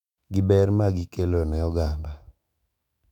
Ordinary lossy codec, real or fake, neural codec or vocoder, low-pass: none; fake; autoencoder, 48 kHz, 128 numbers a frame, DAC-VAE, trained on Japanese speech; 19.8 kHz